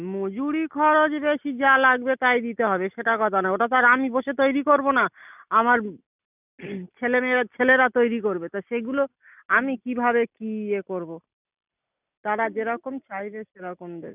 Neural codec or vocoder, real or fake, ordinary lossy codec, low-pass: none; real; none; 3.6 kHz